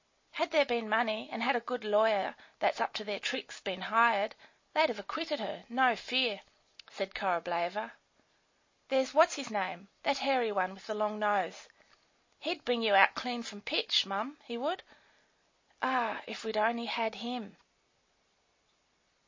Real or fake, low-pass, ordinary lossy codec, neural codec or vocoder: real; 7.2 kHz; MP3, 32 kbps; none